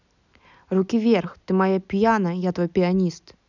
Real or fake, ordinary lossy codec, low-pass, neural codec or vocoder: real; none; 7.2 kHz; none